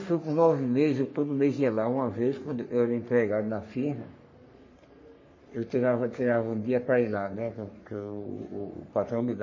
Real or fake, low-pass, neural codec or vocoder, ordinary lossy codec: fake; 7.2 kHz; codec, 44.1 kHz, 3.4 kbps, Pupu-Codec; MP3, 32 kbps